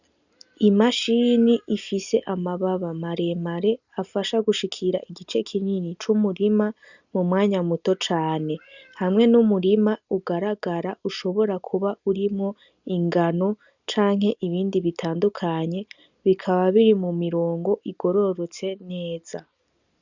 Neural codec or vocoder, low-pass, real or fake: none; 7.2 kHz; real